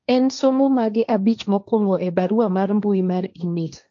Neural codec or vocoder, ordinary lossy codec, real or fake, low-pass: codec, 16 kHz, 1.1 kbps, Voila-Tokenizer; AAC, 64 kbps; fake; 7.2 kHz